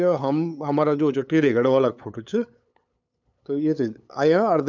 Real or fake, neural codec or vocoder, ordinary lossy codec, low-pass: fake; codec, 16 kHz, 8 kbps, FunCodec, trained on LibriTTS, 25 frames a second; none; 7.2 kHz